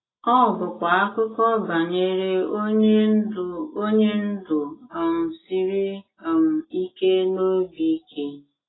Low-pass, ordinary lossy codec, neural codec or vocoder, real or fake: 7.2 kHz; AAC, 16 kbps; none; real